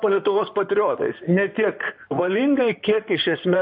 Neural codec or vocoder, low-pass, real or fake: codec, 16 kHz in and 24 kHz out, 2.2 kbps, FireRedTTS-2 codec; 5.4 kHz; fake